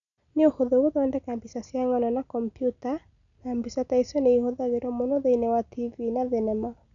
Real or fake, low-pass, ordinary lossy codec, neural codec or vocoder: real; 7.2 kHz; none; none